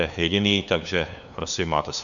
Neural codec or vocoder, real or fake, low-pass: codec, 16 kHz, 2 kbps, FunCodec, trained on LibriTTS, 25 frames a second; fake; 7.2 kHz